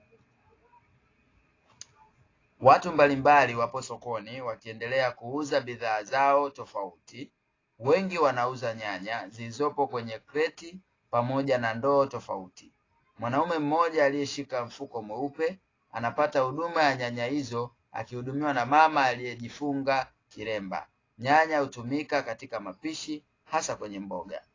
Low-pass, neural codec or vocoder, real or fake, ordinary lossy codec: 7.2 kHz; none; real; AAC, 32 kbps